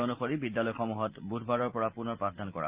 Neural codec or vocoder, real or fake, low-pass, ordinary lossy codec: none; real; 3.6 kHz; Opus, 32 kbps